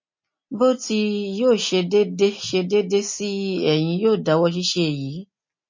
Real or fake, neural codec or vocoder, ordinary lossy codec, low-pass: real; none; MP3, 32 kbps; 7.2 kHz